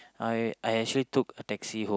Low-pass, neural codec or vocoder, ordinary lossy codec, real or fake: none; none; none; real